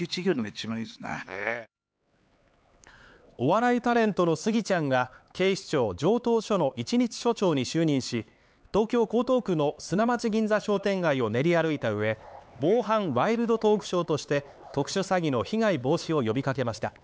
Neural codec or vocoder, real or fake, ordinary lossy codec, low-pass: codec, 16 kHz, 4 kbps, X-Codec, HuBERT features, trained on LibriSpeech; fake; none; none